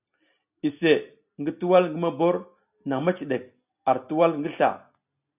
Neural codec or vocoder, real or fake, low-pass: none; real; 3.6 kHz